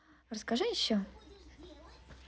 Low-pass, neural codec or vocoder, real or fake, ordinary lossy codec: none; none; real; none